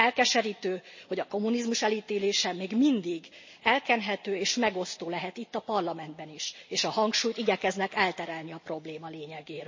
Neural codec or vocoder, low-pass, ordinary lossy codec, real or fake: none; 7.2 kHz; MP3, 32 kbps; real